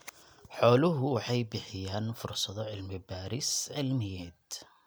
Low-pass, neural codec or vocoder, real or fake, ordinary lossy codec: none; none; real; none